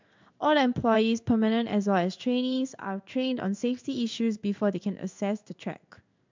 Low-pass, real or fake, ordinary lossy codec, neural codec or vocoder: 7.2 kHz; fake; MP3, 64 kbps; codec, 16 kHz in and 24 kHz out, 1 kbps, XY-Tokenizer